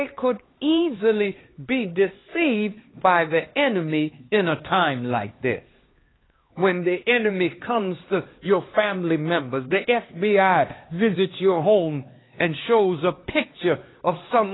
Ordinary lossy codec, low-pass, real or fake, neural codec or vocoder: AAC, 16 kbps; 7.2 kHz; fake; codec, 16 kHz, 2 kbps, X-Codec, HuBERT features, trained on LibriSpeech